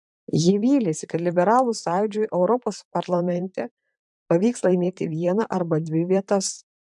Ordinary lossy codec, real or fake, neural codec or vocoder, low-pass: MP3, 96 kbps; fake; vocoder, 44.1 kHz, 128 mel bands, Pupu-Vocoder; 10.8 kHz